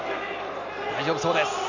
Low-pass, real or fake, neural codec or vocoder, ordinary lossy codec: 7.2 kHz; real; none; none